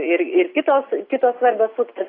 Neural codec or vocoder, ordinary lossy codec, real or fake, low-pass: none; AAC, 24 kbps; real; 5.4 kHz